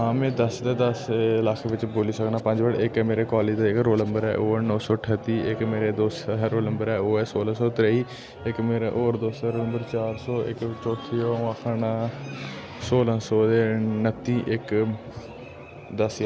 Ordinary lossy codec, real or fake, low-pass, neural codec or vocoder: none; real; none; none